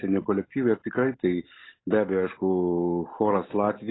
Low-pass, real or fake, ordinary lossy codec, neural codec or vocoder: 7.2 kHz; real; AAC, 16 kbps; none